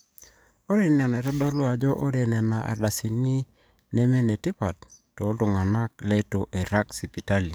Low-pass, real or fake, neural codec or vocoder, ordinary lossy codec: none; fake; codec, 44.1 kHz, 7.8 kbps, DAC; none